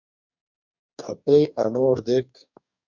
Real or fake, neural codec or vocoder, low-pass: fake; codec, 44.1 kHz, 2.6 kbps, DAC; 7.2 kHz